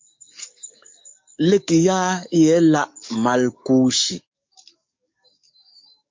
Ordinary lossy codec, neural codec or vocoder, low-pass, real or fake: MP3, 48 kbps; codec, 44.1 kHz, 7.8 kbps, DAC; 7.2 kHz; fake